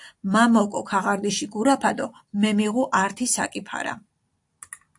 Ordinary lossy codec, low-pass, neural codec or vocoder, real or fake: AAC, 64 kbps; 10.8 kHz; none; real